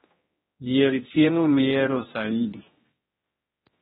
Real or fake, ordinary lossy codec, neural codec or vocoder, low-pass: fake; AAC, 16 kbps; codec, 16 kHz, 1 kbps, X-Codec, HuBERT features, trained on general audio; 7.2 kHz